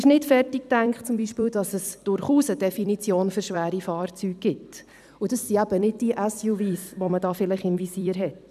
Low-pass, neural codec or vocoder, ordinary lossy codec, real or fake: 14.4 kHz; vocoder, 44.1 kHz, 128 mel bands every 256 samples, BigVGAN v2; none; fake